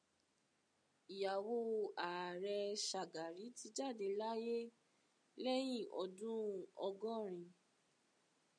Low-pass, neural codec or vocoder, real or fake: 9.9 kHz; none; real